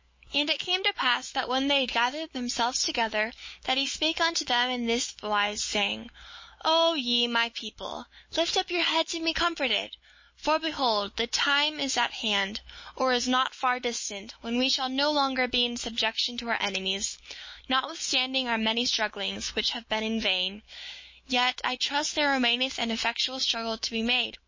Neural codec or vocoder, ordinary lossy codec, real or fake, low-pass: autoencoder, 48 kHz, 128 numbers a frame, DAC-VAE, trained on Japanese speech; MP3, 32 kbps; fake; 7.2 kHz